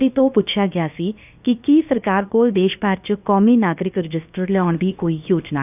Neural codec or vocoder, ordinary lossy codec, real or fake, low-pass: codec, 16 kHz, about 1 kbps, DyCAST, with the encoder's durations; none; fake; 3.6 kHz